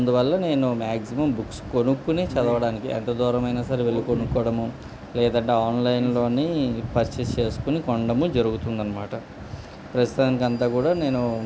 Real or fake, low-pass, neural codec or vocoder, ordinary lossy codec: real; none; none; none